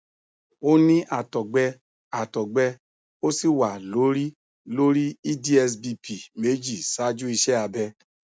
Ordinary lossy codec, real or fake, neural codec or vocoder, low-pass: none; real; none; none